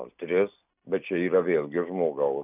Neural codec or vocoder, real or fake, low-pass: none; real; 3.6 kHz